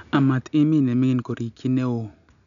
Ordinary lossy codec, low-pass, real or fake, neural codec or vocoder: none; 7.2 kHz; real; none